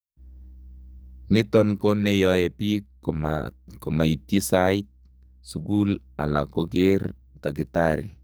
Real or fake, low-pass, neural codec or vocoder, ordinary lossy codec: fake; none; codec, 44.1 kHz, 2.6 kbps, SNAC; none